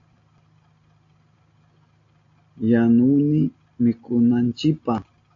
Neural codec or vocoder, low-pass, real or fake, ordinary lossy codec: none; 7.2 kHz; real; AAC, 48 kbps